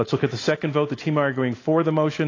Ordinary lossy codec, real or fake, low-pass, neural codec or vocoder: AAC, 32 kbps; real; 7.2 kHz; none